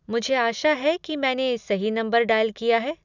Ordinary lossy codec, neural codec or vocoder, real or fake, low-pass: none; none; real; 7.2 kHz